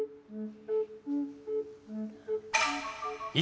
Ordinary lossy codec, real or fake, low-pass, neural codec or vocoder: none; real; none; none